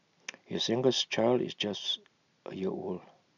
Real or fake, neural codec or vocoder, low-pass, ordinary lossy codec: real; none; 7.2 kHz; none